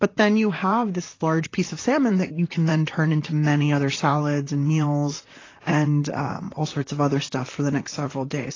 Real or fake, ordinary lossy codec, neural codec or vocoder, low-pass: fake; AAC, 32 kbps; vocoder, 44.1 kHz, 128 mel bands, Pupu-Vocoder; 7.2 kHz